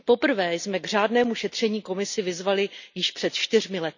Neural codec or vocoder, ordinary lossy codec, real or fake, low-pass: none; none; real; 7.2 kHz